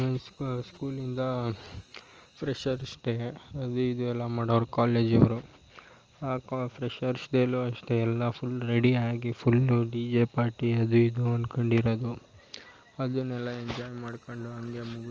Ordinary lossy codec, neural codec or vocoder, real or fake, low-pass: Opus, 24 kbps; none; real; 7.2 kHz